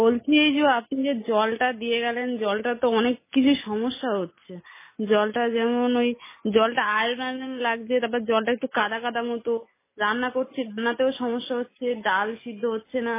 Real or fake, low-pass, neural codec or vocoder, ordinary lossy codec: real; 3.6 kHz; none; MP3, 16 kbps